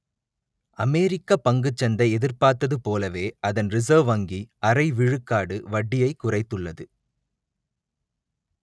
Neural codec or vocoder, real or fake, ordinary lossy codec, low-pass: none; real; none; none